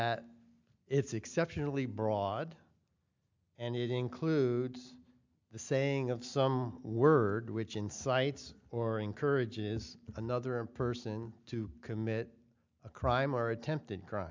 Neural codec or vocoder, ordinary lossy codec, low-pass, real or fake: none; MP3, 64 kbps; 7.2 kHz; real